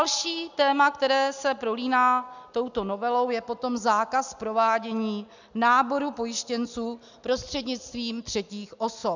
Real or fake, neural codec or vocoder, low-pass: real; none; 7.2 kHz